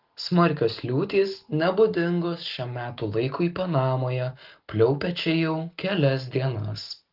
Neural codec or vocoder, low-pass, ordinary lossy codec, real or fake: none; 5.4 kHz; Opus, 32 kbps; real